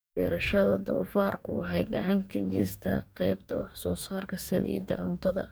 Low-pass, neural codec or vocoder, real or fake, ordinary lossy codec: none; codec, 44.1 kHz, 2.6 kbps, DAC; fake; none